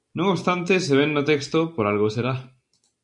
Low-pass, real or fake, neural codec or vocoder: 10.8 kHz; real; none